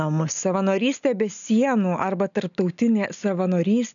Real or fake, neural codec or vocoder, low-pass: real; none; 7.2 kHz